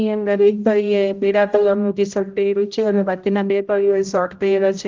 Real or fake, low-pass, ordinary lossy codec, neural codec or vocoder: fake; 7.2 kHz; Opus, 24 kbps; codec, 16 kHz, 0.5 kbps, X-Codec, HuBERT features, trained on general audio